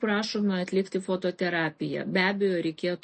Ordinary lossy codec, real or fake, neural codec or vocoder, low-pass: MP3, 32 kbps; real; none; 10.8 kHz